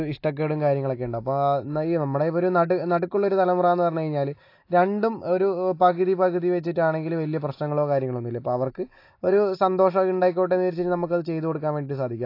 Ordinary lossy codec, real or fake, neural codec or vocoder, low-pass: AAC, 32 kbps; real; none; 5.4 kHz